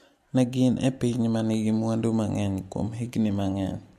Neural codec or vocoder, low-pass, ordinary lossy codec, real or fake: none; 14.4 kHz; MP3, 64 kbps; real